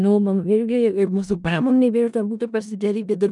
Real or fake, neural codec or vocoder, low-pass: fake; codec, 16 kHz in and 24 kHz out, 0.4 kbps, LongCat-Audio-Codec, four codebook decoder; 10.8 kHz